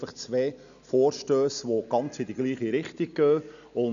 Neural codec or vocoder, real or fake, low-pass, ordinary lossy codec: none; real; 7.2 kHz; none